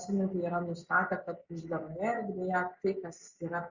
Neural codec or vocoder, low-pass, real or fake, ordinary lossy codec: none; 7.2 kHz; real; Opus, 64 kbps